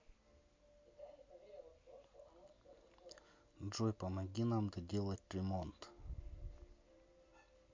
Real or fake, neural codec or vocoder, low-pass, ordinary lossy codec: real; none; 7.2 kHz; MP3, 48 kbps